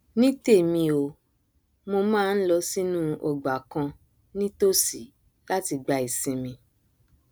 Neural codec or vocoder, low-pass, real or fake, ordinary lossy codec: none; none; real; none